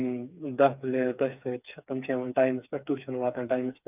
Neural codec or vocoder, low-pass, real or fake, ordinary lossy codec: codec, 16 kHz, 4 kbps, FreqCodec, smaller model; 3.6 kHz; fake; none